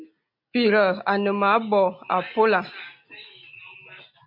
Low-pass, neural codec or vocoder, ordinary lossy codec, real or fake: 5.4 kHz; none; MP3, 48 kbps; real